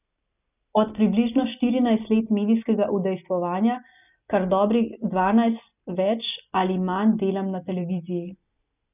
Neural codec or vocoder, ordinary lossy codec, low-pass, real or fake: none; none; 3.6 kHz; real